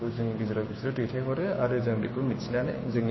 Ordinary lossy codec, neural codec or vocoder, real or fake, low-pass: MP3, 24 kbps; codec, 16 kHz, 6 kbps, DAC; fake; 7.2 kHz